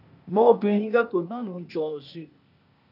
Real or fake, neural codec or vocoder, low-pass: fake; codec, 16 kHz, 0.8 kbps, ZipCodec; 5.4 kHz